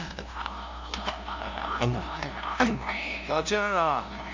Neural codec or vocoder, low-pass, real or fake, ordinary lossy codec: codec, 16 kHz, 0.5 kbps, FunCodec, trained on LibriTTS, 25 frames a second; 7.2 kHz; fake; MP3, 64 kbps